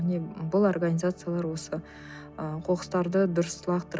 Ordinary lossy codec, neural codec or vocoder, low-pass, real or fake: none; none; none; real